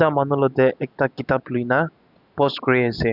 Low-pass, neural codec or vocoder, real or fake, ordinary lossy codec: 5.4 kHz; none; real; none